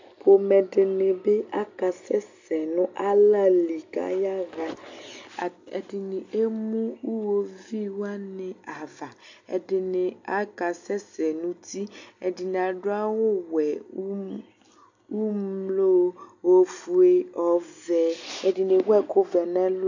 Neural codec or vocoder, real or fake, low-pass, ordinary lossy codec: none; real; 7.2 kHz; AAC, 48 kbps